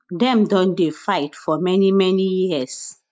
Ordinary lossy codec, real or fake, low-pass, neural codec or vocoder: none; real; none; none